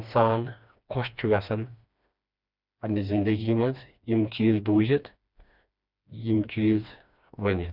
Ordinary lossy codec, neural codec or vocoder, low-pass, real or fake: none; codec, 16 kHz, 2 kbps, FreqCodec, smaller model; 5.4 kHz; fake